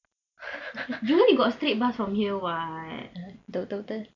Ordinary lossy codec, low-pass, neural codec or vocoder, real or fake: none; 7.2 kHz; none; real